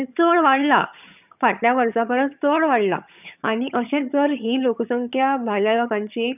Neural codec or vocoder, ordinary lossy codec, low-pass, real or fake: vocoder, 22.05 kHz, 80 mel bands, HiFi-GAN; none; 3.6 kHz; fake